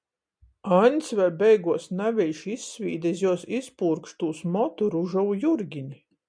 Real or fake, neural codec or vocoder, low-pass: real; none; 9.9 kHz